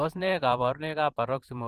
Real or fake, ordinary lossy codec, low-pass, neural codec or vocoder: fake; Opus, 16 kbps; 14.4 kHz; vocoder, 44.1 kHz, 128 mel bands every 512 samples, BigVGAN v2